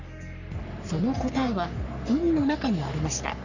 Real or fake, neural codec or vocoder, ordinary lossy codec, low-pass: fake; codec, 44.1 kHz, 3.4 kbps, Pupu-Codec; none; 7.2 kHz